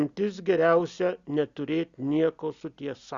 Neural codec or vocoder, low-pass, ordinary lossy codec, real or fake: none; 7.2 kHz; Opus, 64 kbps; real